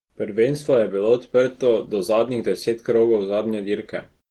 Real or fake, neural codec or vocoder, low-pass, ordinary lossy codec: real; none; 19.8 kHz; Opus, 16 kbps